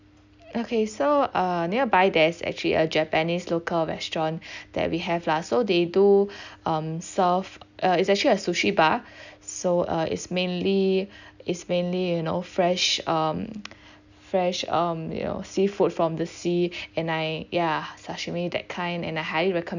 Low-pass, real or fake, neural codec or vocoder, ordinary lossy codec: 7.2 kHz; real; none; none